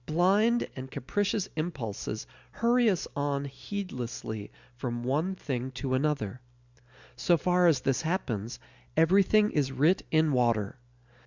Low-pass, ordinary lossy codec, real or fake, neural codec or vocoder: 7.2 kHz; Opus, 64 kbps; real; none